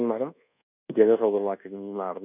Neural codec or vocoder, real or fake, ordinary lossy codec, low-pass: codec, 24 kHz, 1.2 kbps, DualCodec; fake; none; 3.6 kHz